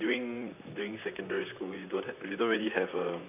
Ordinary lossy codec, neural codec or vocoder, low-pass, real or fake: none; vocoder, 44.1 kHz, 128 mel bands, Pupu-Vocoder; 3.6 kHz; fake